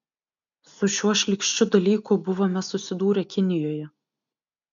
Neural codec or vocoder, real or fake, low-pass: none; real; 7.2 kHz